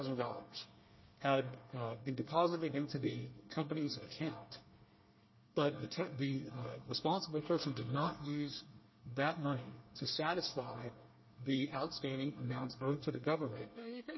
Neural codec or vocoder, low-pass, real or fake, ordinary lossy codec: codec, 24 kHz, 1 kbps, SNAC; 7.2 kHz; fake; MP3, 24 kbps